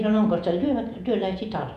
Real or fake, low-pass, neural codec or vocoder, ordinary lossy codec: fake; 14.4 kHz; vocoder, 48 kHz, 128 mel bands, Vocos; none